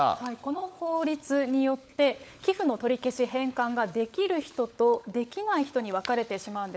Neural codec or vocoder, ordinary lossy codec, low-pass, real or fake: codec, 16 kHz, 16 kbps, FunCodec, trained on LibriTTS, 50 frames a second; none; none; fake